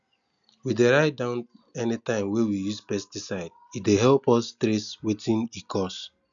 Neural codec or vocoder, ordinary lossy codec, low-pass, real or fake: none; none; 7.2 kHz; real